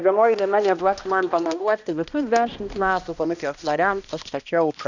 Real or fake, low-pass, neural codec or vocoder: fake; 7.2 kHz; codec, 16 kHz, 1 kbps, X-Codec, HuBERT features, trained on balanced general audio